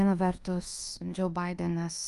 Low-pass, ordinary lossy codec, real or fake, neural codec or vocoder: 10.8 kHz; Opus, 32 kbps; fake; codec, 24 kHz, 1.2 kbps, DualCodec